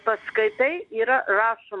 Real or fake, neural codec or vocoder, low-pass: real; none; 10.8 kHz